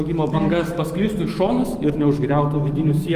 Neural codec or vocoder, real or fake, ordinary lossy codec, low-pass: autoencoder, 48 kHz, 128 numbers a frame, DAC-VAE, trained on Japanese speech; fake; Opus, 24 kbps; 14.4 kHz